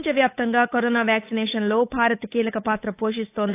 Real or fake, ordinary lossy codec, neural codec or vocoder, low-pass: fake; AAC, 24 kbps; codec, 16 kHz, 8 kbps, FunCodec, trained on Chinese and English, 25 frames a second; 3.6 kHz